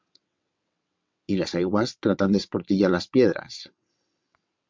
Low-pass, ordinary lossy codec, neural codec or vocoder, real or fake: 7.2 kHz; MP3, 64 kbps; vocoder, 22.05 kHz, 80 mel bands, WaveNeXt; fake